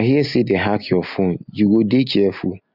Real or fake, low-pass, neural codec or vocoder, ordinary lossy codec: real; 5.4 kHz; none; none